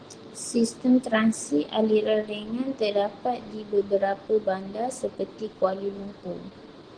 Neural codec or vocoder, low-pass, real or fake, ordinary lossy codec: none; 9.9 kHz; real; Opus, 16 kbps